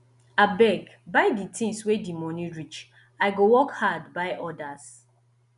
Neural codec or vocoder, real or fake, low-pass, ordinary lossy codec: none; real; 10.8 kHz; none